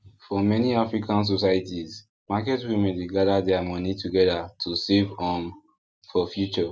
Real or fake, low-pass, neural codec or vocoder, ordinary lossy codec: real; none; none; none